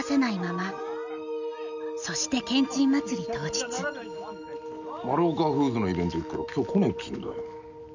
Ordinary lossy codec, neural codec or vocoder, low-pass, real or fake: none; none; 7.2 kHz; real